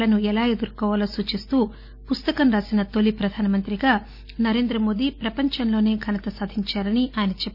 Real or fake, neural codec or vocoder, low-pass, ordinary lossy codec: real; none; 5.4 kHz; none